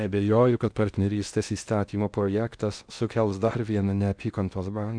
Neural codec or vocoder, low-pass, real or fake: codec, 16 kHz in and 24 kHz out, 0.6 kbps, FocalCodec, streaming, 2048 codes; 9.9 kHz; fake